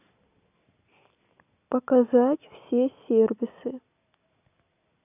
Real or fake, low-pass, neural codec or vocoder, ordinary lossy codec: real; 3.6 kHz; none; none